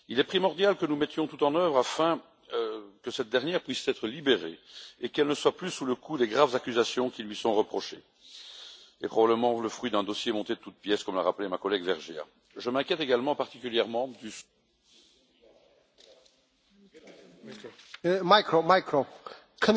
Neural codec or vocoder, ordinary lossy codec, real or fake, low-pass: none; none; real; none